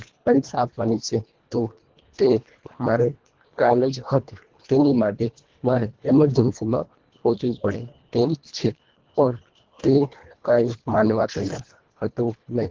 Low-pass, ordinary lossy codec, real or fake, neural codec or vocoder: 7.2 kHz; Opus, 16 kbps; fake; codec, 24 kHz, 1.5 kbps, HILCodec